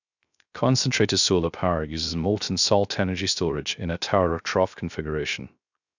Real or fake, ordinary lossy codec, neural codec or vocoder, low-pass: fake; none; codec, 16 kHz, 0.3 kbps, FocalCodec; 7.2 kHz